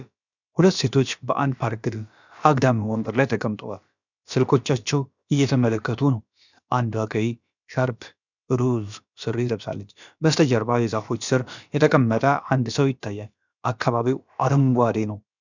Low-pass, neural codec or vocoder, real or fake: 7.2 kHz; codec, 16 kHz, about 1 kbps, DyCAST, with the encoder's durations; fake